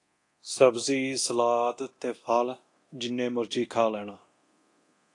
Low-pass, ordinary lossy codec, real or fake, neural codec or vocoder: 10.8 kHz; AAC, 48 kbps; fake; codec, 24 kHz, 0.9 kbps, DualCodec